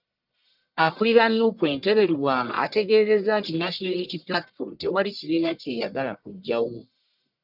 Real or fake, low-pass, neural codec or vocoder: fake; 5.4 kHz; codec, 44.1 kHz, 1.7 kbps, Pupu-Codec